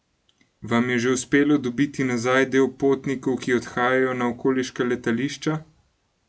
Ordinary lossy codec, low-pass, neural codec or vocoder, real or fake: none; none; none; real